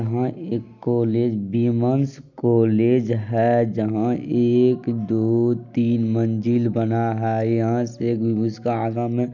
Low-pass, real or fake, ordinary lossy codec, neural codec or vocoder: 7.2 kHz; real; none; none